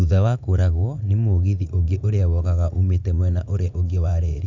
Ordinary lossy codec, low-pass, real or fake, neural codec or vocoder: none; 7.2 kHz; fake; vocoder, 44.1 kHz, 80 mel bands, Vocos